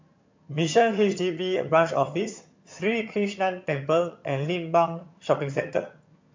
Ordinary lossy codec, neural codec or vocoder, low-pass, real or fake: MP3, 48 kbps; vocoder, 22.05 kHz, 80 mel bands, HiFi-GAN; 7.2 kHz; fake